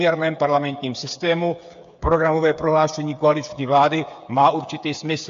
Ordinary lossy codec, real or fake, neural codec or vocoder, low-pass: AAC, 64 kbps; fake; codec, 16 kHz, 8 kbps, FreqCodec, smaller model; 7.2 kHz